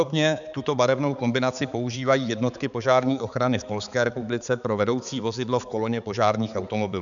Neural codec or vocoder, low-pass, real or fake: codec, 16 kHz, 4 kbps, X-Codec, HuBERT features, trained on balanced general audio; 7.2 kHz; fake